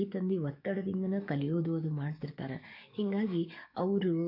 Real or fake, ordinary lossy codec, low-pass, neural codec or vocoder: fake; none; 5.4 kHz; codec, 44.1 kHz, 7.8 kbps, DAC